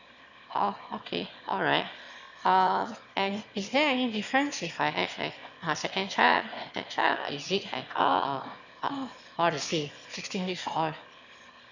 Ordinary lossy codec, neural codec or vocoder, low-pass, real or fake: none; autoencoder, 22.05 kHz, a latent of 192 numbers a frame, VITS, trained on one speaker; 7.2 kHz; fake